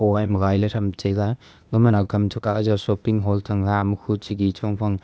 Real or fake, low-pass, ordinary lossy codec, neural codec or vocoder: fake; none; none; codec, 16 kHz, 0.8 kbps, ZipCodec